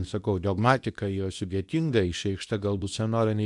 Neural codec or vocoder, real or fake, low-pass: codec, 24 kHz, 0.9 kbps, WavTokenizer, medium speech release version 2; fake; 10.8 kHz